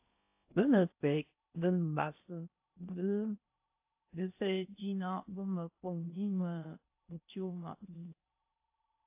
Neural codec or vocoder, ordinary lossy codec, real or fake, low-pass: codec, 16 kHz in and 24 kHz out, 0.6 kbps, FocalCodec, streaming, 4096 codes; AAC, 32 kbps; fake; 3.6 kHz